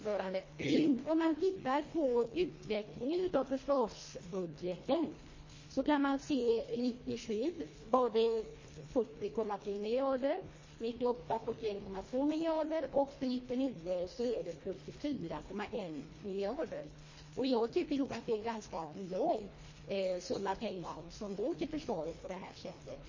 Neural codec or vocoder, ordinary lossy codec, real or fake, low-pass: codec, 24 kHz, 1.5 kbps, HILCodec; MP3, 32 kbps; fake; 7.2 kHz